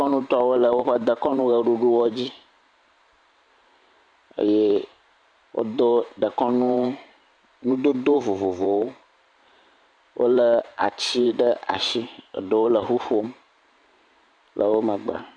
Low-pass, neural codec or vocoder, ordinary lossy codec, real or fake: 9.9 kHz; vocoder, 44.1 kHz, 128 mel bands every 512 samples, BigVGAN v2; MP3, 64 kbps; fake